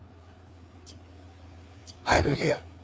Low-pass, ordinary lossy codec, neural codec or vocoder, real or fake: none; none; codec, 16 kHz, 4 kbps, FreqCodec, larger model; fake